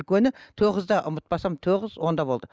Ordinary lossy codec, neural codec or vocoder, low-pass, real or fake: none; none; none; real